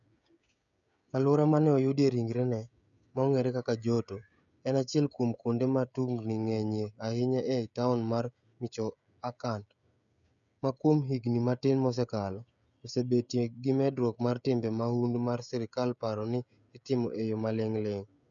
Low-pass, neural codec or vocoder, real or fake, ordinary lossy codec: 7.2 kHz; codec, 16 kHz, 16 kbps, FreqCodec, smaller model; fake; none